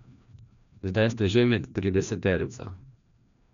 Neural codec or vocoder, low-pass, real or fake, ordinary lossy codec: codec, 16 kHz, 1 kbps, FreqCodec, larger model; 7.2 kHz; fake; none